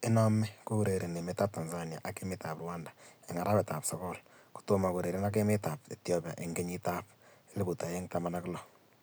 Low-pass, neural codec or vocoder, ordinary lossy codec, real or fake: none; none; none; real